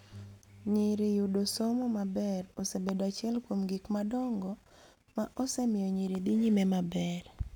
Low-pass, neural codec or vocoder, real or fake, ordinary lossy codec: 19.8 kHz; none; real; none